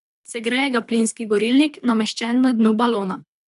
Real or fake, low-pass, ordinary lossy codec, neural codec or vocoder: fake; 10.8 kHz; none; codec, 24 kHz, 3 kbps, HILCodec